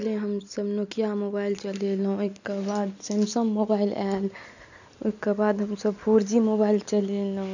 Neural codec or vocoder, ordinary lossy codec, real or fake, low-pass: none; none; real; 7.2 kHz